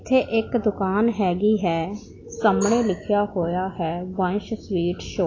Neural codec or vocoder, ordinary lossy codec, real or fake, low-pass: none; AAC, 32 kbps; real; 7.2 kHz